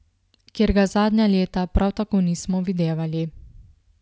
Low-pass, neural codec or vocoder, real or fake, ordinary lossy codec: none; none; real; none